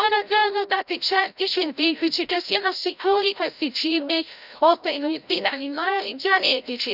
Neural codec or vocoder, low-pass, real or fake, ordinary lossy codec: codec, 16 kHz, 0.5 kbps, FreqCodec, larger model; 5.4 kHz; fake; none